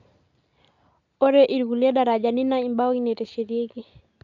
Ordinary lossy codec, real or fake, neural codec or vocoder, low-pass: none; real; none; 7.2 kHz